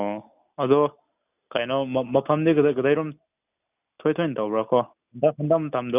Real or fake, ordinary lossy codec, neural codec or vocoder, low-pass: real; none; none; 3.6 kHz